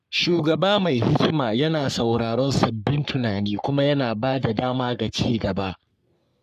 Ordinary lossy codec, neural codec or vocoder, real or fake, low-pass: none; codec, 44.1 kHz, 3.4 kbps, Pupu-Codec; fake; 14.4 kHz